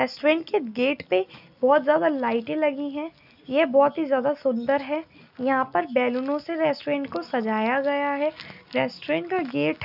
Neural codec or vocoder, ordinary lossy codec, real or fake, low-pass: none; none; real; 5.4 kHz